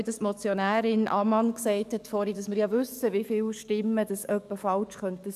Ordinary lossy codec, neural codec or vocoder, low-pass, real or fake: none; codec, 44.1 kHz, 7.8 kbps, DAC; 14.4 kHz; fake